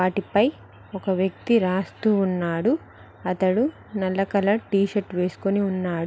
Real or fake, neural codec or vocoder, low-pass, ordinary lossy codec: real; none; none; none